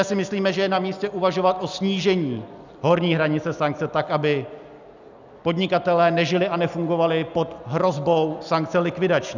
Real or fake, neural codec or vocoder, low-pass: real; none; 7.2 kHz